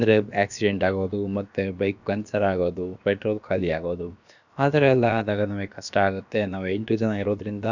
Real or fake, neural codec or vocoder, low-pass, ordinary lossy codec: fake; codec, 16 kHz, about 1 kbps, DyCAST, with the encoder's durations; 7.2 kHz; none